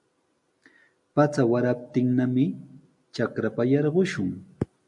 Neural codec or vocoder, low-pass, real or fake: none; 10.8 kHz; real